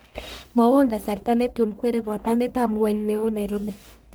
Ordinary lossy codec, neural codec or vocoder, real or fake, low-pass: none; codec, 44.1 kHz, 1.7 kbps, Pupu-Codec; fake; none